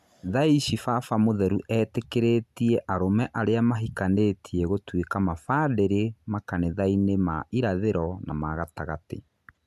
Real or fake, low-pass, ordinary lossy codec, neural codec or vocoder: real; 14.4 kHz; none; none